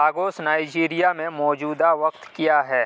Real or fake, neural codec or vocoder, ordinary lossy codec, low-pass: real; none; none; none